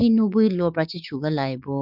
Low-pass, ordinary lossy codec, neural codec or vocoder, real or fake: 5.4 kHz; none; codec, 16 kHz, 6 kbps, DAC; fake